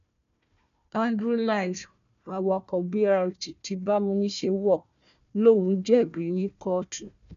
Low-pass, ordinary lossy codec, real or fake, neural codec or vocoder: 7.2 kHz; MP3, 96 kbps; fake; codec, 16 kHz, 1 kbps, FunCodec, trained on Chinese and English, 50 frames a second